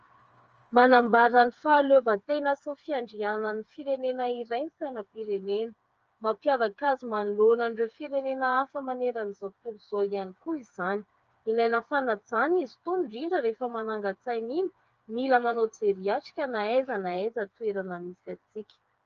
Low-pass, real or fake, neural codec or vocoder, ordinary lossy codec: 7.2 kHz; fake; codec, 16 kHz, 4 kbps, FreqCodec, smaller model; Opus, 24 kbps